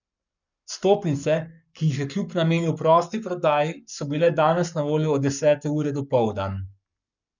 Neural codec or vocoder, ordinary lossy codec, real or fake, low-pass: codec, 44.1 kHz, 7.8 kbps, Pupu-Codec; none; fake; 7.2 kHz